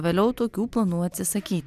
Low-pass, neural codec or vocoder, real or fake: 14.4 kHz; none; real